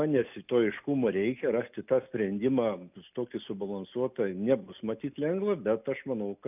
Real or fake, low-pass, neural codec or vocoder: real; 3.6 kHz; none